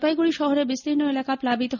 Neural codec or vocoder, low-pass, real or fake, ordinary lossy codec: none; 7.2 kHz; real; none